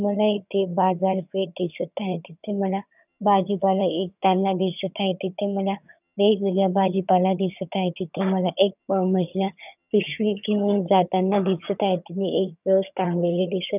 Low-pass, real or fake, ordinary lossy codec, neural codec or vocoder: 3.6 kHz; fake; none; vocoder, 22.05 kHz, 80 mel bands, HiFi-GAN